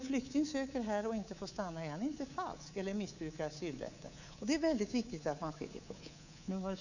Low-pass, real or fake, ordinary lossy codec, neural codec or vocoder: 7.2 kHz; fake; none; codec, 24 kHz, 3.1 kbps, DualCodec